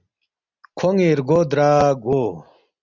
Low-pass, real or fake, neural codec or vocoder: 7.2 kHz; real; none